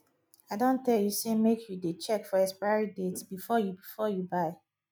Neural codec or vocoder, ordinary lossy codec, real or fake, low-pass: none; none; real; none